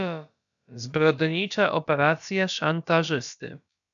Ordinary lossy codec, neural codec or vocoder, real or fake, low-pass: MP3, 96 kbps; codec, 16 kHz, about 1 kbps, DyCAST, with the encoder's durations; fake; 7.2 kHz